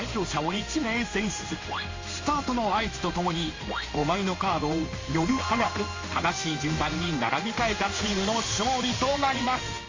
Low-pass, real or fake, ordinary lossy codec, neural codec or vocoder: 7.2 kHz; fake; AAC, 32 kbps; codec, 16 kHz in and 24 kHz out, 1 kbps, XY-Tokenizer